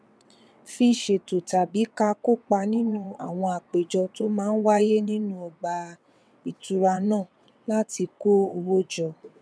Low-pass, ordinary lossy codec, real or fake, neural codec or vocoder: none; none; fake; vocoder, 22.05 kHz, 80 mel bands, WaveNeXt